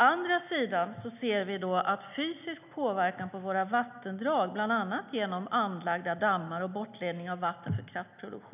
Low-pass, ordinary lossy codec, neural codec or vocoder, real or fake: 3.6 kHz; none; none; real